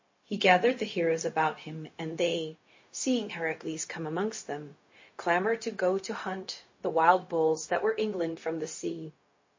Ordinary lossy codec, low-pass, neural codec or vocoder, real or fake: MP3, 32 kbps; 7.2 kHz; codec, 16 kHz, 0.4 kbps, LongCat-Audio-Codec; fake